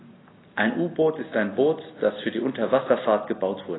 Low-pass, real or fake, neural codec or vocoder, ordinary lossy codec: 7.2 kHz; real; none; AAC, 16 kbps